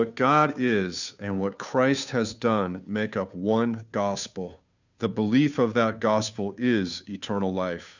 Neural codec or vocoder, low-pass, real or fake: codec, 16 kHz, 2 kbps, FunCodec, trained on Chinese and English, 25 frames a second; 7.2 kHz; fake